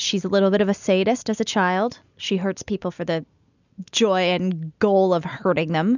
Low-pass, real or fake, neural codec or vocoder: 7.2 kHz; real; none